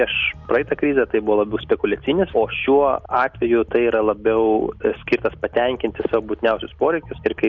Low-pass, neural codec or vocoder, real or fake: 7.2 kHz; none; real